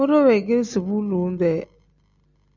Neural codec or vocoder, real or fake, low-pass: none; real; 7.2 kHz